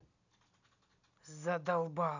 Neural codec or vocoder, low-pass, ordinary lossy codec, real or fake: none; 7.2 kHz; none; real